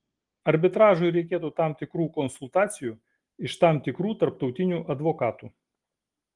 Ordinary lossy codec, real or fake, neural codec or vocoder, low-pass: Opus, 24 kbps; real; none; 10.8 kHz